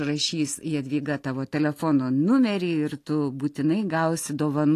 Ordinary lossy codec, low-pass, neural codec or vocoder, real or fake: AAC, 48 kbps; 14.4 kHz; none; real